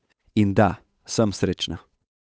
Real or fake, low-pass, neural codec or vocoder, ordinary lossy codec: fake; none; codec, 16 kHz, 8 kbps, FunCodec, trained on Chinese and English, 25 frames a second; none